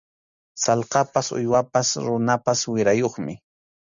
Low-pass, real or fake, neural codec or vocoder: 7.2 kHz; real; none